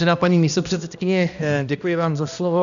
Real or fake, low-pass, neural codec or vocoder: fake; 7.2 kHz; codec, 16 kHz, 1 kbps, X-Codec, HuBERT features, trained on balanced general audio